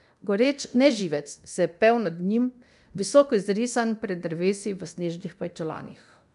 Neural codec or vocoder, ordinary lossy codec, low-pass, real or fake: codec, 24 kHz, 0.9 kbps, DualCodec; none; 10.8 kHz; fake